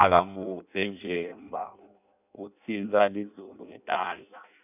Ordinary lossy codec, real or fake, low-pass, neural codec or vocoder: none; fake; 3.6 kHz; codec, 16 kHz in and 24 kHz out, 0.6 kbps, FireRedTTS-2 codec